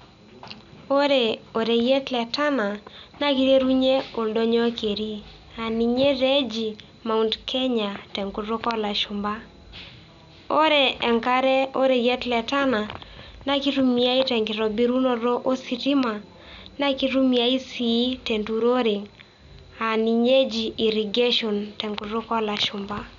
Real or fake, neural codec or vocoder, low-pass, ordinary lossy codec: real; none; 7.2 kHz; none